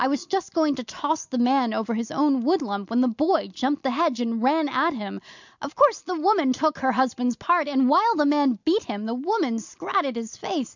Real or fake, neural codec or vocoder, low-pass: real; none; 7.2 kHz